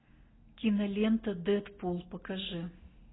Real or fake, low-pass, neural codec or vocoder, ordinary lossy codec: real; 7.2 kHz; none; AAC, 16 kbps